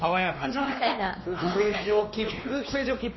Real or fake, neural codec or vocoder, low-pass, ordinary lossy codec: fake; codec, 16 kHz, 2 kbps, X-Codec, WavLM features, trained on Multilingual LibriSpeech; 7.2 kHz; MP3, 24 kbps